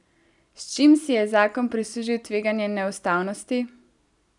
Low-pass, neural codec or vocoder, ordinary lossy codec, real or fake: 10.8 kHz; none; none; real